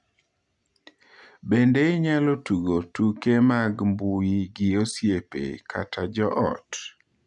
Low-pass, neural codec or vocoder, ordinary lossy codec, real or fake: 10.8 kHz; none; none; real